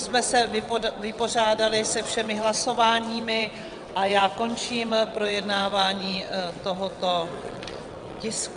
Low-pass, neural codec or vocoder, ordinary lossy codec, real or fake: 9.9 kHz; vocoder, 22.05 kHz, 80 mel bands, WaveNeXt; AAC, 96 kbps; fake